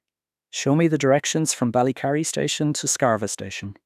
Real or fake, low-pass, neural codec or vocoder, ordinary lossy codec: fake; 14.4 kHz; autoencoder, 48 kHz, 32 numbers a frame, DAC-VAE, trained on Japanese speech; none